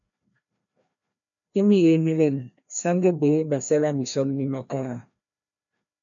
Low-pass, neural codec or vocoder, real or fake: 7.2 kHz; codec, 16 kHz, 1 kbps, FreqCodec, larger model; fake